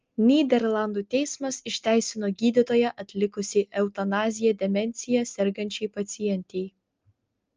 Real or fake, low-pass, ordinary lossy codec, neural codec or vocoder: real; 7.2 kHz; Opus, 24 kbps; none